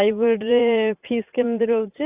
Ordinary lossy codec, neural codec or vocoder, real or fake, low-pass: Opus, 64 kbps; vocoder, 44.1 kHz, 80 mel bands, Vocos; fake; 3.6 kHz